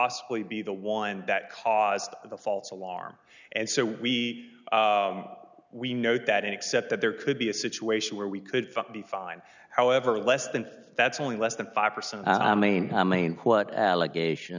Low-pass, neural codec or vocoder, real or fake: 7.2 kHz; none; real